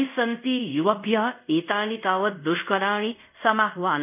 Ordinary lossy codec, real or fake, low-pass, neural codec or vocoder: none; fake; 3.6 kHz; codec, 24 kHz, 0.5 kbps, DualCodec